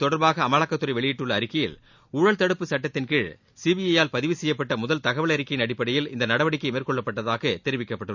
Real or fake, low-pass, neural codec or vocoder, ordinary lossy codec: real; 7.2 kHz; none; none